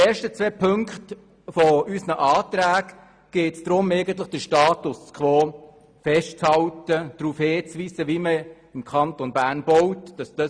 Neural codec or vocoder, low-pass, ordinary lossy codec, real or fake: none; 9.9 kHz; Opus, 64 kbps; real